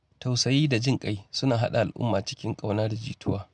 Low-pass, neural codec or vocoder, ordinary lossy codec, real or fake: none; none; none; real